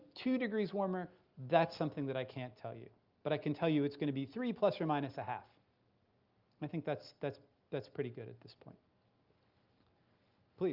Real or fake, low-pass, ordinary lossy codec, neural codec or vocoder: real; 5.4 kHz; Opus, 24 kbps; none